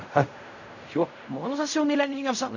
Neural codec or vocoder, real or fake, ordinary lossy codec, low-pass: codec, 16 kHz in and 24 kHz out, 0.4 kbps, LongCat-Audio-Codec, fine tuned four codebook decoder; fake; none; 7.2 kHz